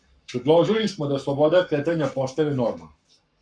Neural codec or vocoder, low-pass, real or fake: codec, 44.1 kHz, 7.8 kbps, Pupu-Codec; 9.9 kHz; fake